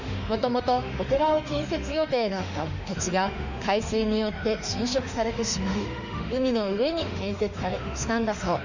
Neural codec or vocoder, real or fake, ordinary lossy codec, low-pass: autoencoder, 48 kHz, 32 numbers a frame, DAC-VAE, trained on Japanese speech; fake; none; 7.2 kHz